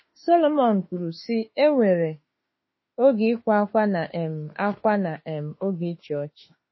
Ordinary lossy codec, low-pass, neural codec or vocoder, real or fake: MP3, 24 kbps; 7.2 kHz; autoencoder, 48 kHz, 32 numbers a frame, DAC-VAE, trained on Japanese speech; fake